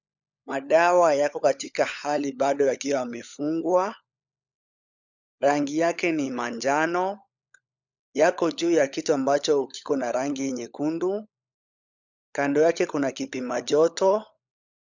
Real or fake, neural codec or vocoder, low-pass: fake; codec, 16 kHz, 16 kbps, FunCodec, trained on LibriTTS, 50 frames a second; 7.2 kHz